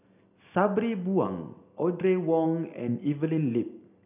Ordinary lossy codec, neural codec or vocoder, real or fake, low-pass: none; none; real; 3.6 kHz